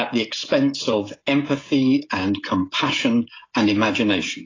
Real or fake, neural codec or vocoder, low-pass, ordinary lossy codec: fake; codec, 16 kHz, 16 kbps, FreqCodec, smaller model; 7.2 kHz; AAC, 32 kbps